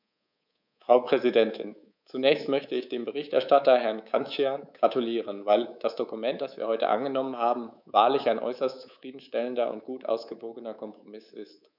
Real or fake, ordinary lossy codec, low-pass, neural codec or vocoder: fake; none; 5.4 kHz; codec, 24 kHz, 3.1 kbps, DualCodec